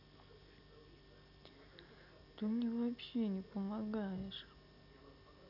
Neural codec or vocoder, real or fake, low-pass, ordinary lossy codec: none; real; 5.4 kHz; none